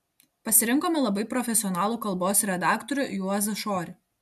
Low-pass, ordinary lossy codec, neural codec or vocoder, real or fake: 14.4 kHz; AAC, 96 kbps; none; real